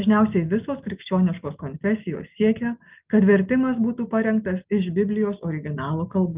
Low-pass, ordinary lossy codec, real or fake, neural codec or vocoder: 3.6 kHz; Opus, 24 kbps; real; none